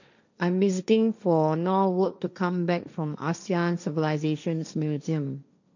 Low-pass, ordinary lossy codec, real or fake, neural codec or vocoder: 7.2 kHz; none; fake; codec, 16 kHz, 1.1 kbps, Voila-Tokenizer